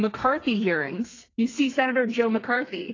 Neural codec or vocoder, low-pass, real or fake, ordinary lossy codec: codec, 32 kHz, 1.9 kbps, SNAC; 7.2 kHz; fake; AAC, 32 kbps